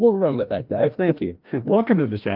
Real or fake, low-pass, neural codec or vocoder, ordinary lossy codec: fake; 5.4 kHz; codec, 16 kHz, 1 kbps, FreqCodec, larger model; Opus, 32 kbps